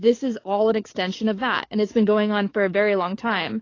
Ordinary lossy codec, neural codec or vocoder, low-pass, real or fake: AAC, 32 kbps; codec, 44.1 kHz, 7.8 kbps, DAC; 7.2 kHz; fake